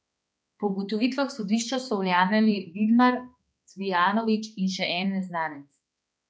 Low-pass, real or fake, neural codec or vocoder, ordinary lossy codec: none; fake; codec, 16 kHz, 2 kbps, X-Codec, HuBERT features, trained on balanced general audio; none